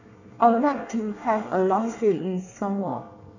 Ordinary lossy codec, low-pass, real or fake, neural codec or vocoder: none; 7.2 kHz; fake; codec, 24 kHz, 1 kbps, SNAC